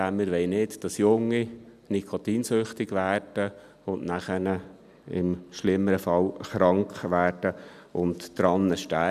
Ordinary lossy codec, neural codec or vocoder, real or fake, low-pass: none; none; real; 14.4 kHz